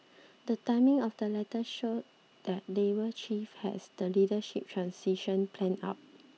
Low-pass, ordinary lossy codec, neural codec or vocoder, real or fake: none; none; none; real